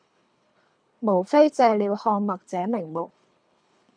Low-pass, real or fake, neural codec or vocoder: 9.9 kHz; fake; codec, 24 kHz, 3 kbps, HILCodec